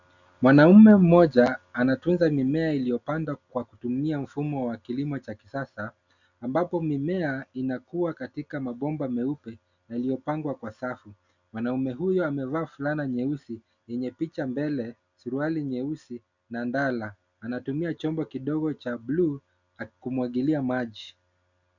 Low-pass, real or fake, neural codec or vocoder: 7.2 kHz; real; none